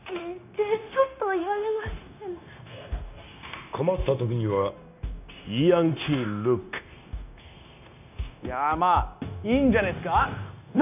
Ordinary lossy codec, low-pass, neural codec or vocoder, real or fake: none; 3.6 kHz; codec, 16 kHz, 0.9 kbps, LongCat-Audio-Codec; fake